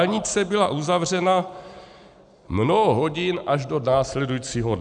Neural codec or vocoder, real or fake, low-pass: none; real; 9.9 kHz